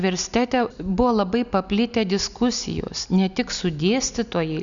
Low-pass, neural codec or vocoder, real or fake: 7.2 kHz; none; real